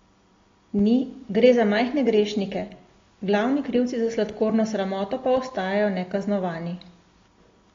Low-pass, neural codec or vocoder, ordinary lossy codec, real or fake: 7.2 kHz; none; AAC, 32 kbps; real